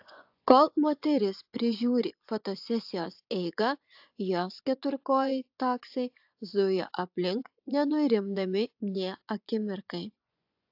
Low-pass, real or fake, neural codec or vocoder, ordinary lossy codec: 5.4 kHz; fake; vocoder, 24 kHz, 100 mel bands, Vocos; AAC, 48 kbps